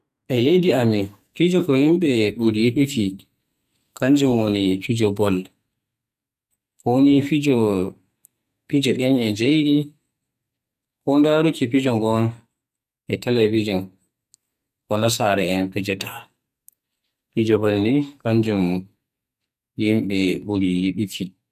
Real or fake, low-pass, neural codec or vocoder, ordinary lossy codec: fake; 14.4 kHz; codec, 44.1 kHz, 2.6 kbps, SNAC; none